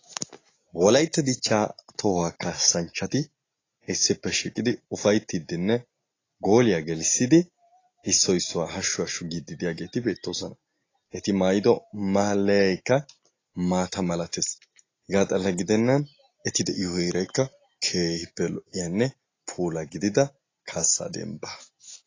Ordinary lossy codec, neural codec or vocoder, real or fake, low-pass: AAC, 32 kbps; none; real; 7.2 kHz